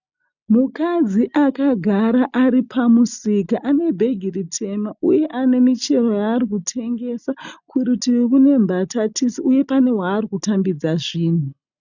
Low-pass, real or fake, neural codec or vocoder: 7.2 kHz; real; none